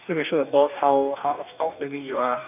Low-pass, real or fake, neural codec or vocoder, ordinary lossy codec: 3.6 kHz; fake; codec, 44.1 kHz, 2.6 kbps, DAC; none